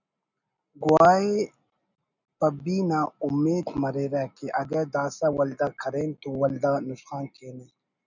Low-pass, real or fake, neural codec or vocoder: 7.2 kHz; real; none